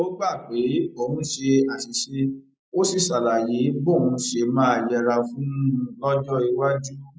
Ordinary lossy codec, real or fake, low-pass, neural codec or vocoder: none; real; none; none